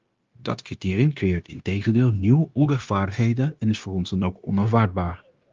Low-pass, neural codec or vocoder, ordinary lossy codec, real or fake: 7.2 kHz; codec, 16 kHz, 0.9 kbps, LongCat-Audio-Codec; Opus, 32 kbps; fake